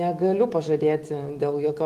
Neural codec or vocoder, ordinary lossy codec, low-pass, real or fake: autoencoder, 48 kHz, 128 numbers a frame, DAC-VAE, trained on Japanese speech; Opus, 24 kbps; 14.4 kHz; fake